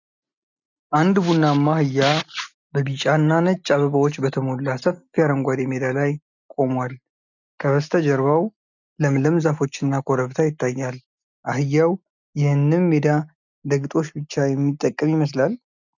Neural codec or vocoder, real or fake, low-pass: none; real; 7.2 kHz